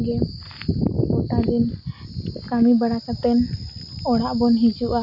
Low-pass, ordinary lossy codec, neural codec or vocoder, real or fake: 5.4 kHz; MP3, 32 kbps; none; real